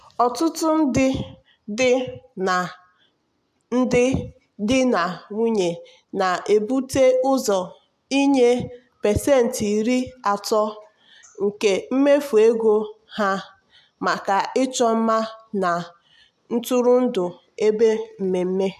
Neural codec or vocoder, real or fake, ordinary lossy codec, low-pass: none; real; AAC, 96 kbps; 14.4 kHz